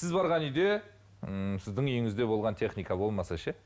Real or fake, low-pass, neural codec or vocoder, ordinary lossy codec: real; none; none; none